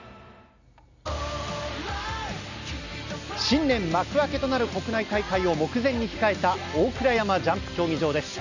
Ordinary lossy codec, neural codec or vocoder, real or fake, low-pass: none; none; real; 7.2 kHz